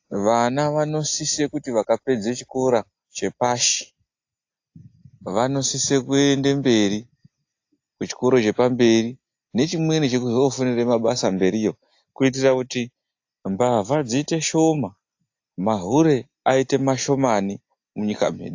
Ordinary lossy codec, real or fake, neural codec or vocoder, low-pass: AAC, 48 kbps; real; none; 7.2 kHz